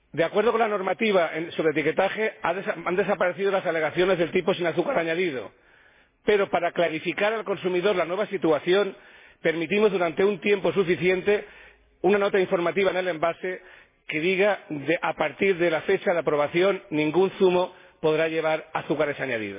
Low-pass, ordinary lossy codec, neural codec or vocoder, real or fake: 3.6 kHz; MP3, 16 kbps; none; real